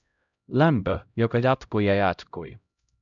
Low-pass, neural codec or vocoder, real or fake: 7.2 kHz; codec, 16 kHz, 0.5 kbps, X-Codec, HuBERT features, trained on LibriSpeech; fake